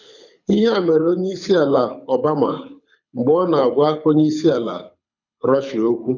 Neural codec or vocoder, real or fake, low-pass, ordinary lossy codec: codec, 24 kHz, 6 kbps, HILCodec; fake; 7.2 kHz; none